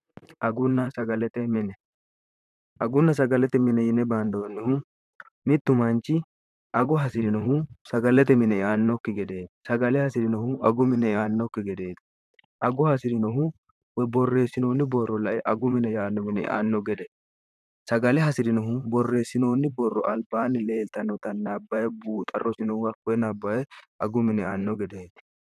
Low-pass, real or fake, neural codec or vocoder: 14.4 kHz; fake; vocoder, 44.1 kHz, 128 mel bands, Pupu-Vocoder